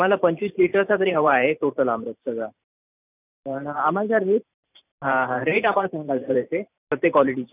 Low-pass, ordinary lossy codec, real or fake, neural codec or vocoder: 3.6 kHz; none; fake; vocoder, 44.1 kHz, 128 mel bands every 512 samples, BigVGAN v2